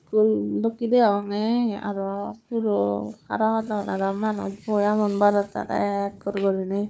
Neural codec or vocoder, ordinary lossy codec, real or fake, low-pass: codec, 16 kHz, 4 kbps, FunCodec, trained on Chinese and English, 50 frames a second; none; fake; none